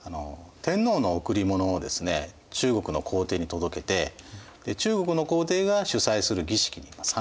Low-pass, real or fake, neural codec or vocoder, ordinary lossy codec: none; real; none; none